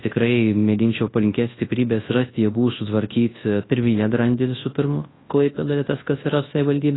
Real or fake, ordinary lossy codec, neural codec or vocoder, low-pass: fake; AAC, 16 kbps; codec, 24 kHz, 0.9 kbps, WavTokenizer, large speech release; 7.2 kHz